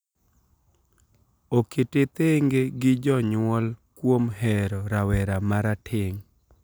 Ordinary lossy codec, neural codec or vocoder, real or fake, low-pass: none; none; real; none